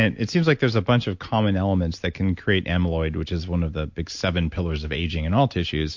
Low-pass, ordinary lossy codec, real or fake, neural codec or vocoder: 7.2 kHz; MP3, 48 kbps; real; none